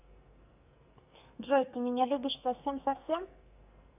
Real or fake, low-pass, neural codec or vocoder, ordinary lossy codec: fake; 3.6 kHz; codec, 44.1 kHz, 2.6 kbps, SNAC; none